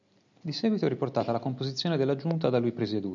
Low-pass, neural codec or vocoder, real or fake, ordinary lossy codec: 7.2 kHz; none; real; MP3, 96 kbps